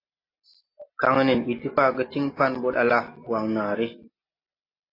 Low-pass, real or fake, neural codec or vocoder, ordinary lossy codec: 5.4 kHz; real; none; AAC, 32 kbps